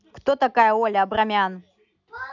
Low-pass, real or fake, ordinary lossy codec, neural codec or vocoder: 7.2 kHz; real; none; none